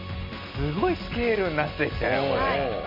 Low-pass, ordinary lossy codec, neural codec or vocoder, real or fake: 5.4 kHz; none; none; real